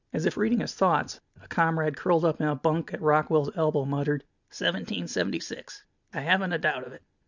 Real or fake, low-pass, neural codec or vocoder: real; 7.2 kHz; none